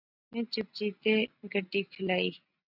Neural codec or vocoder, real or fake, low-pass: none; real; 5.4 kHz